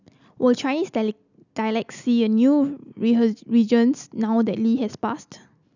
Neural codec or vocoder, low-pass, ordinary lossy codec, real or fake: none; 7.2 kHz; none; real